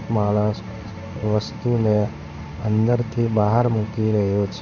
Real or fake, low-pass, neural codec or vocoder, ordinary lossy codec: fake; 7.2 kHz; codec, 16 kHz in and 24 kHz out, 1 kbps, XY-Tokenizer; none